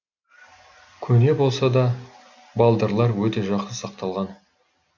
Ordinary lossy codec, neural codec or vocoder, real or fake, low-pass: none; none; real; 7.2 kHz